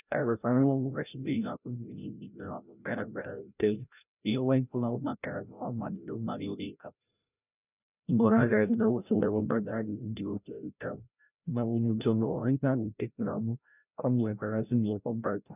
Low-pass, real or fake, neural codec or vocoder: 3.6 kHz; fake; codec, 16 kHz, 0.5 kbps, FreqCodec, larger model